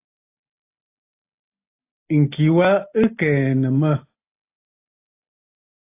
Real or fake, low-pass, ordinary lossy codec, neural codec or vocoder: real; 3.6 kHz; AAC, 24 kbps; none